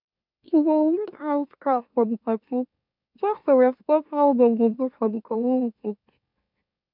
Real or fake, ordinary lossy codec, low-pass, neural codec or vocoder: fake; none; 5.4 kHz; autoencoder, 44.1 kHz, a latent of 192 numbers a frame, MeloTTS